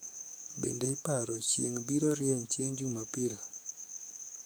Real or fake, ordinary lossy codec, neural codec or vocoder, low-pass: fake; none; codec, 44.1 kHz, 7.8 kbps, DAC; none